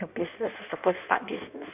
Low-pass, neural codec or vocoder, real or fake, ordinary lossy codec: 3.6 kHz; codec, 16 kHz in and 24 kHz out, 1.1 kbps, FireRedTTS-2 codec; fake; none